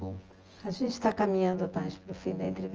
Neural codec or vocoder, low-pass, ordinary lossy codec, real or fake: vocoder, 24 kHz, 100 mel bands, Vocos; 7.2 kHz; Opus, 24 kbps; fake